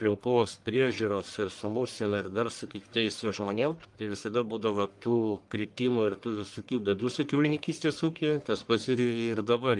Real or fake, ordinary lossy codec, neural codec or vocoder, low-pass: fake; Opus, 32 kbps; codec, 44.1 kHz, 1.7 kbps, Pupu-Codec; 10.8 kHz